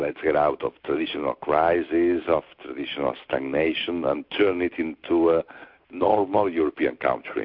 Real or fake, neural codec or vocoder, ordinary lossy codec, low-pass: real; none; MP3, 48 kbps; 5.4 kHz